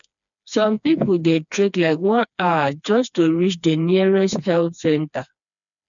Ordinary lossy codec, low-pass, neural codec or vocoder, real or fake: none; 7.2 kHz; codec, 16 kHz, 2 kbps, FreqCodec, smaller model; fake